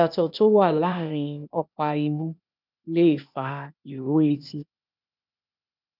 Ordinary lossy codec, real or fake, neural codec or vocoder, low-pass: none; fake; codec, 16 kHz, 0.8 kbps, ZipCodec; 5.4 kHz